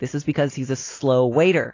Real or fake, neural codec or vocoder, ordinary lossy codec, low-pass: real; none; AAC, 32 kbps; 7.2 kHz